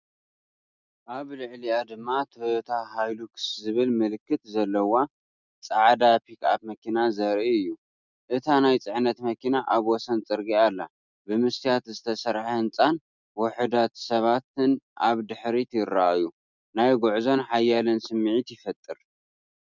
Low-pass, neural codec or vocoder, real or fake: 7.2 kHz; none; real